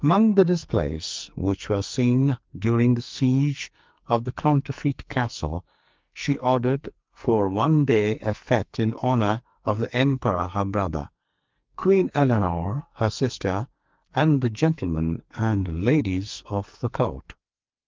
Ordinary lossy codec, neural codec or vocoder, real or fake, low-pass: Opus, 24 kbps; codec, 44.1 kHz, 2.6 kbps, SNAC; fake; 7.2 kHz